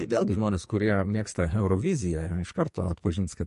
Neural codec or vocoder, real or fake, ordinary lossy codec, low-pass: codec, 44.1 kHz, 2.6 kbps, SNAC; fake; MP3, 48 kbps; 14.4 kHz